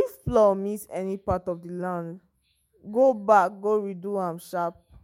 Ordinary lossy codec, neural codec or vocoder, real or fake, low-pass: MP3, 64 kbps; autoencoder, 48 kHz, 128 numbers a frame, DAC-VAE, trained on Japanese speech; fake; 19.8 kHz